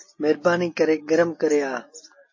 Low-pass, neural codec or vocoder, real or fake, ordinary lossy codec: 7.2 kHz; none; real; MP3, 32 kbps